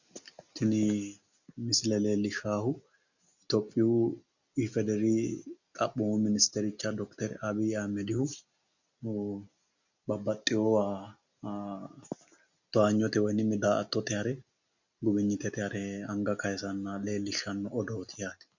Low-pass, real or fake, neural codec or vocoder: 7.2 kHz; real; none